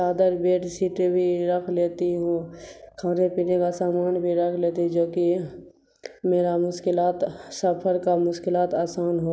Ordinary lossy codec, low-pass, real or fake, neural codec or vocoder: none; none; real; none